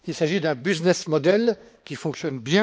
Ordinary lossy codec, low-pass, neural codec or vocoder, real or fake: none; none; codec, 16 kHz, 2 kbps, X-Codec, HuBERT features, trained on balanced general audio; fake